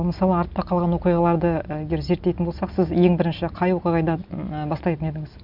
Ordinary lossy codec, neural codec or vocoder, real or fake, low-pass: none; none; real; 5.4 kHz